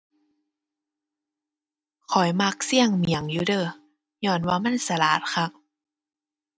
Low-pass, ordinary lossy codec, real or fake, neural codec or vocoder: none; none; real; none